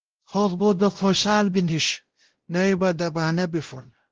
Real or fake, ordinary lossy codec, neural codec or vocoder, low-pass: fake; Opus, 16 kbps; codec, 16 kHz, 0.5 kbps, X-Codec, WavLM features, trained on Multilingual LibriSpeech; 7.2 kHz